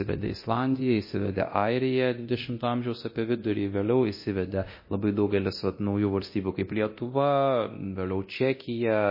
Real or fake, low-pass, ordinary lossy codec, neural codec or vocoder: fake; 5.4 kHz; MP3, 24 kbps; codec, 24 kHz, 0.9 kbps, DualCodec